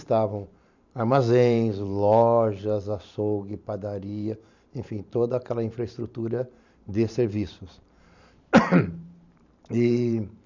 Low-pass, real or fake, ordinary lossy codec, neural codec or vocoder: 7.2 kHz; fake; none; vocoder, 44.1 kHz, 128 mel bands every 512 samples, BigVGAN v2